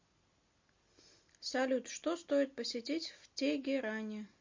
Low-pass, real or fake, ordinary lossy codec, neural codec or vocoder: 7.2 kHz; real; MP3, 48 kbps; none